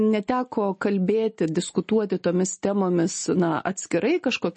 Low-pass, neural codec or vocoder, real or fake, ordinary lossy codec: 10.8 kHz; none; real; MP3, 32 kbps